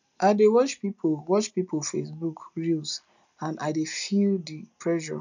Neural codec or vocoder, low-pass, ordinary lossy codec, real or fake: none; 7.2 kHz; none; real